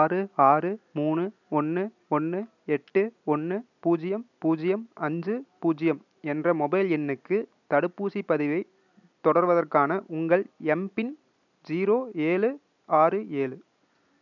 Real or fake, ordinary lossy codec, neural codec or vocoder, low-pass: real; none; none; 7.2 kHz